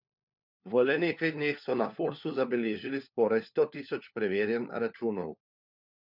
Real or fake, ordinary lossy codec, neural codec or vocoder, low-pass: fake; none; codec, 16 kHz, 4 kbps, FunCodec, trained on LibriTTS, 50 frames a second; 5.4 kHz